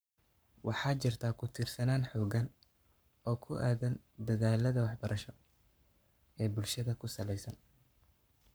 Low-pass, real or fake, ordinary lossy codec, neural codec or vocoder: none; fake; none; codec, 44.1 kHz, 7.8 kbps, Pupu-Codec